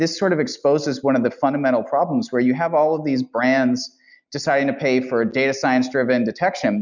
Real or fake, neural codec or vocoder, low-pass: real; none; 7.2 kHz